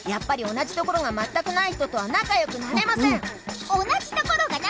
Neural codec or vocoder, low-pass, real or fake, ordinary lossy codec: none; none; real; none